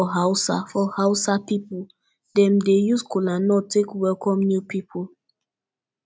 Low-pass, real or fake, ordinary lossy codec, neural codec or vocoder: none; real; none; none